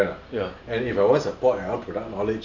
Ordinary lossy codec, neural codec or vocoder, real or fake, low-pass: none; none; real; 7.2 kHz